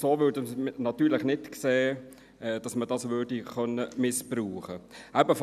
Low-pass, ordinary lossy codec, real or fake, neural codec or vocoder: 14.4 kHz; none; real; none